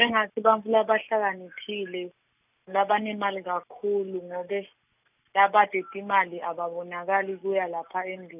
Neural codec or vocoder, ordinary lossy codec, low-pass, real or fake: none; none; 3.6 kHz; real